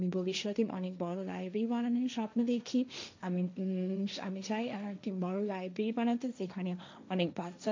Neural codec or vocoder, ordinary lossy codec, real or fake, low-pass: codec, 16 kHz, 1.1 kbps, Voila-Tokenizer; MP3, 64 kbps; fake; 7.2 kHz